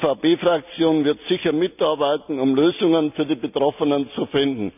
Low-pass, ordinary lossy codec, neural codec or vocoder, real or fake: 3.6 kHz; none; none; real